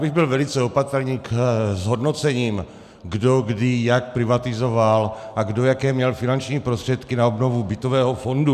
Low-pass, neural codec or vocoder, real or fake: 14.4 kHz; none; real